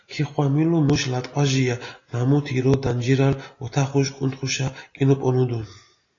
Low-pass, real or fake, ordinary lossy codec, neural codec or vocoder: 7.2 kHz; real; AAC, 32 kbps; none